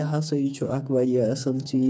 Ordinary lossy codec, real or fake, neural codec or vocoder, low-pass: none; fake; codec, 16 kHz, 4 kbps, FreqCodec, smaller model; none